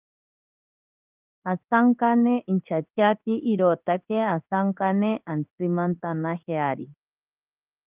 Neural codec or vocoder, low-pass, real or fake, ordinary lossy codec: codec, 16 kHz in and 24 kHz out, 1 kbps, XY-Tokenizer; 3.6 kHz; fake; Opus, 24 kbps